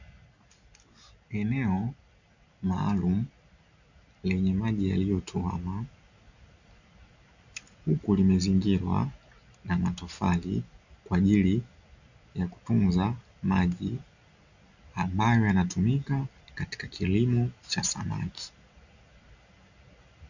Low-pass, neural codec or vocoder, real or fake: 7.2 kHz; none; real